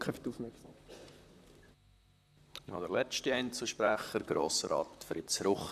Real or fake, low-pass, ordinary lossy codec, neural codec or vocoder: fake; 14.4 kHz; none; vocoder, 44.1 kHz, 128 mel bands, Pupu-Vocoder